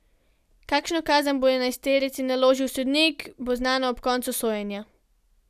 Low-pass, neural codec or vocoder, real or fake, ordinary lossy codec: 14.4 kHz; none; real; none